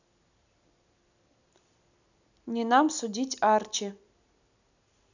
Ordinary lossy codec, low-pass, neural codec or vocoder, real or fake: none; 7.2 kHz; none; real